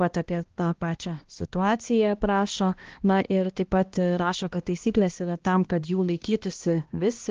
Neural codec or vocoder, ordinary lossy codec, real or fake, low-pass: codec, 16 kHz, 1 kbps, X-Codec, HuBERT features, trained on balanced general audio; Opus, 16 kbps; fake; 7.2 kHz